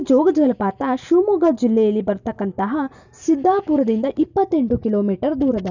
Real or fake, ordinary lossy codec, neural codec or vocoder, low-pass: real; none; none; 7.2 kHz